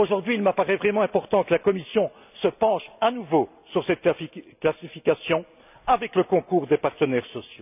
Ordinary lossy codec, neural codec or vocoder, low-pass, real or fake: none; none; 3.6 kHz; real